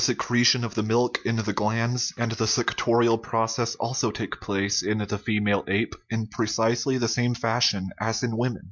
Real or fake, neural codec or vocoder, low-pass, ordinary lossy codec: real; none; 7.2 kHz; MP3, 64 kbps